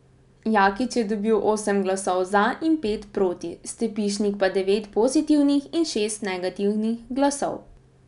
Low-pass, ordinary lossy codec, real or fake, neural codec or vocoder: 10.8 kHz; none; real; none